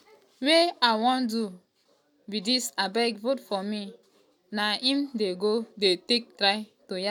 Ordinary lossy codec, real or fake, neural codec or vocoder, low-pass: none; real; none; 19.8 kHz